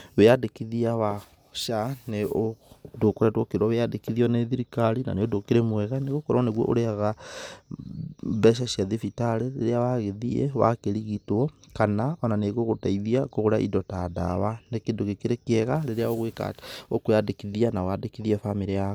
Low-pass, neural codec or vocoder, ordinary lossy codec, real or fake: none; none; none; real